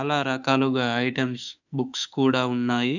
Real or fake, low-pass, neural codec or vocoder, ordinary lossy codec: fake; 7.2 kHz; autoencoder, 48 kHz, 32 numbers a frame, DAC-VAE, trained on Japanese speech; none